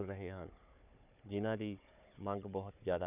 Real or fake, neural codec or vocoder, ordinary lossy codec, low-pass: fake; codec, 16 kHz, 4 kbps, FunCodec, trained on Chinese and English, 50 frames a second; none; 3.6 kHz